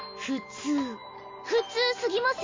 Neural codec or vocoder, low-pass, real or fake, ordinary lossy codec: none; 7.2 kHz; real; AAC, 32 kbps